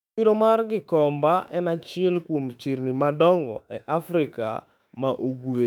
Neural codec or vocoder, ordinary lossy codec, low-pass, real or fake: autoencoder, 48 kHz, 32 numbers a frame, DAC-VAE, trained on Japanese speech; none; 19.8 kHz; fake